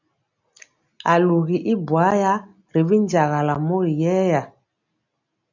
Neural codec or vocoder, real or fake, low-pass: none; real; 7.2 kHz